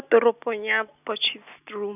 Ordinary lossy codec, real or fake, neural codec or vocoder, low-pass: none; real; none; 3.6 kHz